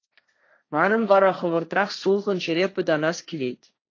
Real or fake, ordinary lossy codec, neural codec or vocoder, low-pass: fake; AAC, 48 kbps; codec, 16 kHz, 1.1 kbps, Voila-Tokenizer; 7.2 kHz